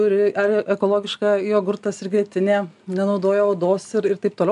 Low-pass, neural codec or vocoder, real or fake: 10.8 kHz; none; real